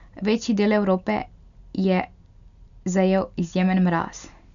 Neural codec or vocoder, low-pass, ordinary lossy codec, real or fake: none; 7.2 kHz; none; real